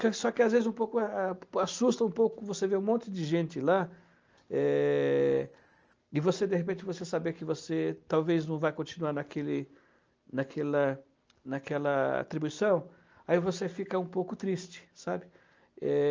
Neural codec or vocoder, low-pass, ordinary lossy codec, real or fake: none; 7.2 kHz; Opus, 24 kbps; real